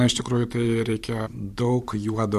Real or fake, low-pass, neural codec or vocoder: fake; 14.4 kHz; vocoder, 44.1 kHz, 128 mel bands every 512 samples, BigVGAN v2